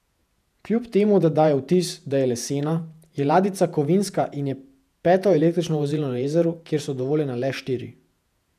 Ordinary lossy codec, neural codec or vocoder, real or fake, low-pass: none; vocoder, 48 kHz, 128 mel bands, Vocos; fake; 14.4 kHz